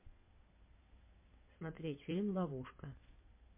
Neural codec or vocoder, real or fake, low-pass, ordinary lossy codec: codec, 16 kHz in and 24 kHz out, 2.2 kbps, FireRedTTS-2 codec; fake; 3.6 kHz; MP3, 24 kbps